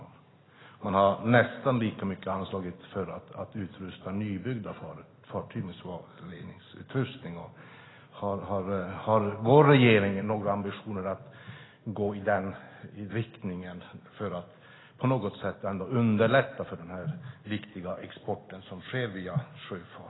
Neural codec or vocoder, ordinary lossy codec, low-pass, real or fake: none; AAC, 16 kbps; 7.2 kHz; real